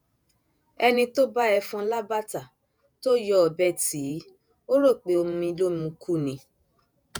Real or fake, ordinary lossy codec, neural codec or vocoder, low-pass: fake; none; vocoder, 48 kHz, 128 mel bands, Vocos; none